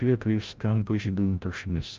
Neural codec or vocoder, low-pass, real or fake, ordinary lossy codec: codec, 16 kHz, 0.5 kbps, FreqCodec, larger model; 7.2 kHz; fake; Opus, 24 kbps